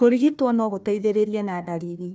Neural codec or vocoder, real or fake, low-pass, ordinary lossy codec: codec, 16 kHz, 1 kbps, FunCodec, trained on Chinese and English, 50 frames a second; fake; none; none